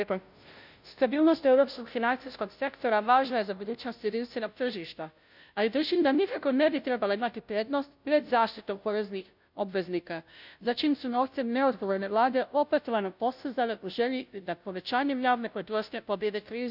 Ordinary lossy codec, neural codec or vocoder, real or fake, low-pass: none; codec, 16 kHz, 0.5 kbps, FunCodec, trained on Chinese and English, 25 frames a second; fake; 5.4 kHz